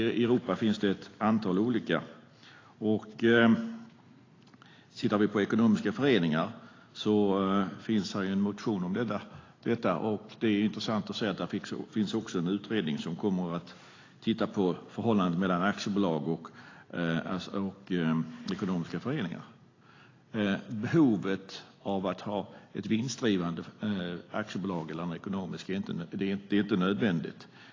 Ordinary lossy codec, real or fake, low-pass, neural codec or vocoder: AAC, 32 kbps; real; 7.2 kHz; none